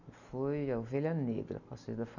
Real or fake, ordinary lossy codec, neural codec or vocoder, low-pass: real; none; none; 7.2 kHz